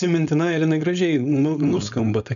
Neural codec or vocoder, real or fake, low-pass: codec, 16 kHz, 4.8 kbps, FACodec; fake; 7.2 kHz